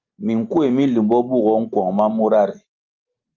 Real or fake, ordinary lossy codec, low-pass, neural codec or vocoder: real; Opus, 24 kbps; 7.2 kHz; none